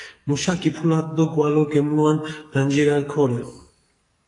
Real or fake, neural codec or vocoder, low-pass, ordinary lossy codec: fake; codec, 44.1 kHz, 2.6 kbps, SNAC; 10.8 kHz; AAC, 48 kbps